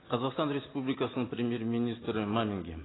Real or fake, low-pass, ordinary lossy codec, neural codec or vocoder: real; 7.2 kHz; AAC, 16 kbps; none